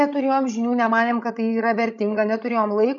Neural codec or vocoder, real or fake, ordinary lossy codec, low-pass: codec, 16 kHz, 16 kbps, FreqCodec, larger model; fake; MP3, 96 kbps; 7.2 kHz